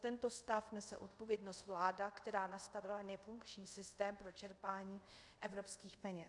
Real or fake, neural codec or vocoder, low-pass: fake; codec, 24 kHz, 0.5 kbps, DualCodec; 10.8 kHz